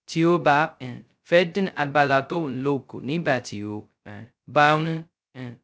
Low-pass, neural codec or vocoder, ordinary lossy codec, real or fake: none; codec, 16 kHz, 0.2 kbps, FocalCodec; none; fake